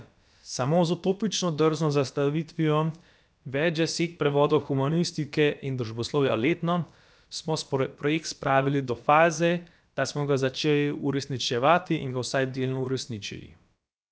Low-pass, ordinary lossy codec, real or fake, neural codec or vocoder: none; none; fake; codec, 16 kHz, about 1 kbps, DyCAST, with the encoder's durations